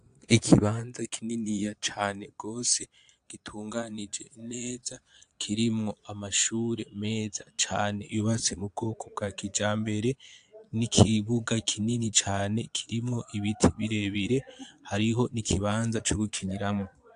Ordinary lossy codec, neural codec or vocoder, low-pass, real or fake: AAC, 64 kbps; vocoder, 22.05 kHz, 80 mel bands, Vocos; 9.9 kHz; fake